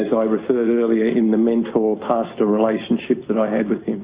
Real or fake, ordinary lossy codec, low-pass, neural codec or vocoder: real; Opus, 64 kbps; 3.6 kHz; none